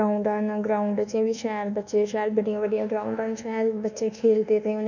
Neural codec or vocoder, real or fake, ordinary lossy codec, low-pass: codec, 24 kHz, 1.2 kbps, DualCodec; fake; none; 7.2 kHz